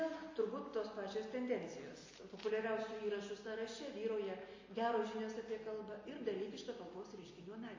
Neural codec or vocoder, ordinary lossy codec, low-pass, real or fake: none; MP3, 32 kbps; 7.2 kHz; real